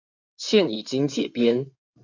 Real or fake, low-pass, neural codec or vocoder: fake; 7.2 kHz; codec, 16 kHz in and 24 kHz out, 2.2 kbps, FireRedTTS-2 codec